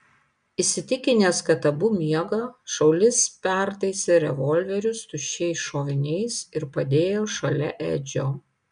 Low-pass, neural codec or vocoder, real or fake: 9.9 kHz; none; real